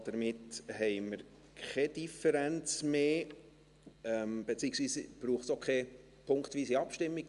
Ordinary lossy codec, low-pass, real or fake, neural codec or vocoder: Opus, 64 kbps; 10.8 kHz; real; none